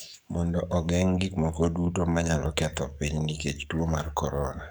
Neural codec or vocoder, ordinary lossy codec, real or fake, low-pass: codec, 44.1 kHz, 7.8 kbps, DAC; none; fake; none